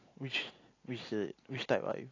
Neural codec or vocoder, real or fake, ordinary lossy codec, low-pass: none; real; AAC, 32 kbps; 7.2 kHz